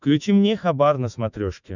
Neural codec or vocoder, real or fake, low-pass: autoencoder, 48 kHz, 128 numbers a frame, DAC-VAE, trained on Japanese speech; fake; 7.2 kHz